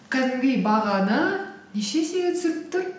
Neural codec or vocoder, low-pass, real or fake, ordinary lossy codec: none; none; real; none